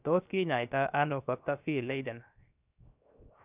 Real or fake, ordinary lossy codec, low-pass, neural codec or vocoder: fake; none; 3.6 kHz; codec, 16 kHz, 0.7 kbps, FocalCodec